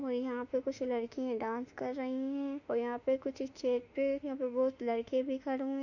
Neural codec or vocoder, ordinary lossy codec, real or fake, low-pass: autoencoder, 48 kHz, 32 numbers a frame, DAC-VAE, trained on Japanese speech; none; fake; 7.2 kHz